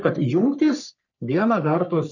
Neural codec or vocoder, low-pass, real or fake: codec, 44.1 kHz, 3.4 kbps, Pupu-Codec; 7.2 kHz; fake